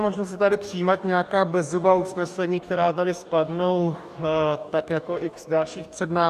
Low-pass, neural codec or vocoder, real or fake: 14.4 kHz; codec, 44.1 kHz, 2.6 kbps, DAC; fake